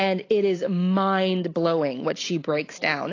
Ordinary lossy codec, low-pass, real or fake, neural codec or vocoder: AAC, 32 kbps; 7.2 kHz; real; none